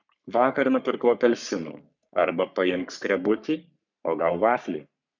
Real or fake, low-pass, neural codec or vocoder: fake; 7.2 kHz; codec, 44.1 kHz, 3.4 kbps, Pupu-Codec